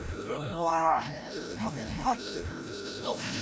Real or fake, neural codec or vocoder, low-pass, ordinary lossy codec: fake; codec, 16 kHz, 0.5 kbps, FreqCodec, larger model; none; none